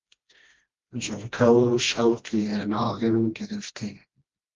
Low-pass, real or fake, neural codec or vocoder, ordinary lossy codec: 7.2 kHz; fake; codec, 16 kHz, 1 kbps, FreqCodec, smaller model; Opus, 32 kbps